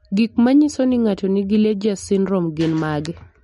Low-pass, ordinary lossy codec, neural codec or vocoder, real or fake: 19.8 kHz; MP3, 48 kbps; none; real